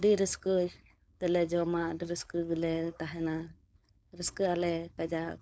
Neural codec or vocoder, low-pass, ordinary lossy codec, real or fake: codec, 16 kHz, 4.8 kbps, FACodec; none; none; fake